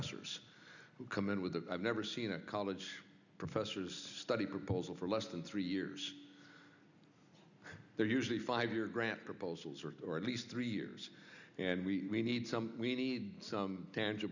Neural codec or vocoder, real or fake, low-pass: none; real; 7.2 kHz